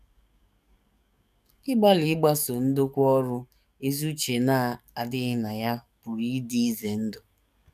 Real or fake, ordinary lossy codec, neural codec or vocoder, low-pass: fake; none; codec, 44.1 kHz, 7.8 kbps, DAC; 14.4 kHz